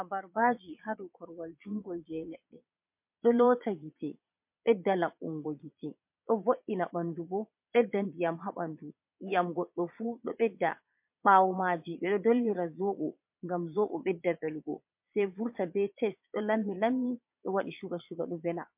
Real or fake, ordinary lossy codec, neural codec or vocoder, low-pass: fake; MP3, 32 kbps; vocoder, 22.05 kHz, 80 mel bands, Vocos; 3.6 kHz